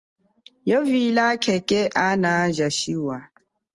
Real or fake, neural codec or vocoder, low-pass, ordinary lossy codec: real; none; 10.8 kHz; Opus, 24 kbps